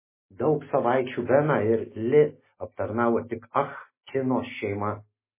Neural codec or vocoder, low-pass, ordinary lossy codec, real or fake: none; 3.6 kHz; MP3, 16 kbps; real